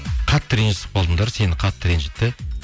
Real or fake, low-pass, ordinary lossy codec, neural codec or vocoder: real; none; none; none